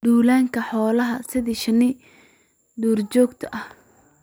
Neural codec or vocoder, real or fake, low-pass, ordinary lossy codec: none; real; none; none